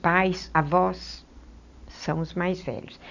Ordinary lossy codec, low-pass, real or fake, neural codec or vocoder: none; 7.2 kHz; real; none